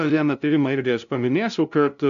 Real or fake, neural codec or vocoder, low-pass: fake; codec, 16 kHz, 0.5 kbps, FunCodec, trained on LibriTTS, 25 frames a second; 7.2 kHz